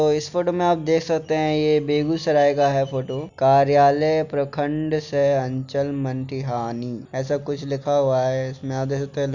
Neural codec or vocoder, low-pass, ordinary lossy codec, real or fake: none; 7.2 kHz; none; real